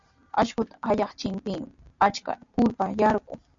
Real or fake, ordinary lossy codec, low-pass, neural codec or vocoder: real; MP3, 64 kbps; 7.2 kHz; none